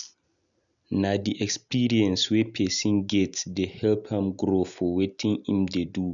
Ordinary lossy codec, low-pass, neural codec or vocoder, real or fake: MP3, 96 kbps; 7.2 kHz; none; real